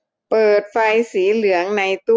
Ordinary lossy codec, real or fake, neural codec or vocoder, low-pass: none; real; none; none